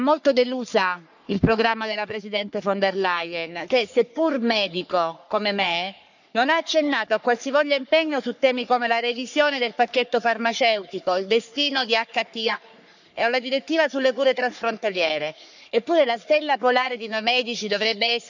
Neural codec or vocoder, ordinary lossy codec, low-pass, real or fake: codec, 44.1 kHz, 3.4 kbps, Pupu-Codec; none; 7.2 kHz; fake